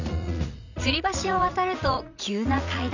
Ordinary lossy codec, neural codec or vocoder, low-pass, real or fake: none; vocoder, 44.1 kHz, 80 mel bands, Vocos; 7.2 kHz; fake